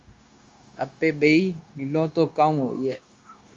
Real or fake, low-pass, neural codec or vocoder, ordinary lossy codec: fake; 7.2 kHz; codec, 16 kHz, 0.9 kbps, LongCat-Audio-Codec; Opus, 32 kbps